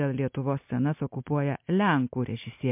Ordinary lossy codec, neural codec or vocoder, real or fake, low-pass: MP3, 32 kbps; none; real; 3.6 kHz